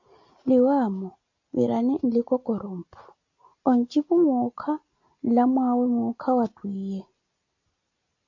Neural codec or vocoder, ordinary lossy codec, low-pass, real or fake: none; AAC, 48 kbps; 7.2 kHz; real